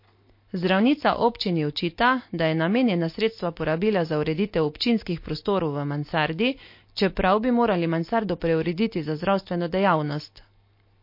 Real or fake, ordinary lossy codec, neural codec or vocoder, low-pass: real; MP3, 32 kbps; none; 5.4 kHz